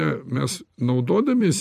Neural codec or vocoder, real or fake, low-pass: vocoder, 44.1 kHz, 128 mel bands every 256 samples, BigVGAN v2; fake; 14.4 kHz